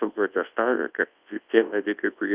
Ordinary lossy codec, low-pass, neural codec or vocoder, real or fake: Opus, 32 kbps; 3.6 kHz; codec, 24 kHz, 0.9 kbps, WavTokenizer, large speech release; fake